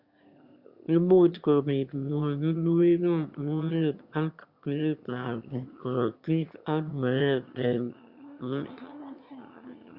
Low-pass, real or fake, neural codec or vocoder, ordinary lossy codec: 5.4 kHz; fake; autoencoder, 22.05 kHz, a latent of 192 numbers a frame, VITS, trained on one speaker; Opus, 64 kbps